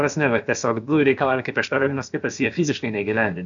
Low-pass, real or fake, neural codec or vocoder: 7.2 kHz; fake; codec, 16 kHz, about 1 kbps, DyCAST, with the encoder's durations